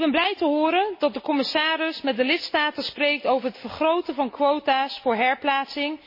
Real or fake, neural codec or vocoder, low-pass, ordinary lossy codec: real; none; 5.4 kHz; MP3, 24 kbps